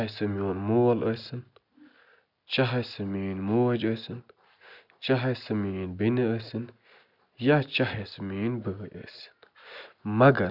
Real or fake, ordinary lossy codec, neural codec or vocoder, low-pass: real; none; none; 5.4 kHz